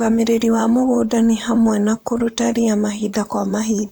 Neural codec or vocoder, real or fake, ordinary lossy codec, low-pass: vocoder, 44.1 kHz, 128 mel bands, Pupu-Vocoder; fake; none; none